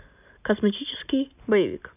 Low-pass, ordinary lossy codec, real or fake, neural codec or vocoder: 3.6 kHz; none; real; none